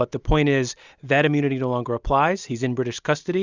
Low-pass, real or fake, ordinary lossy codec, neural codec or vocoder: 7.2 kHz; real; Opus, 64 kbps; none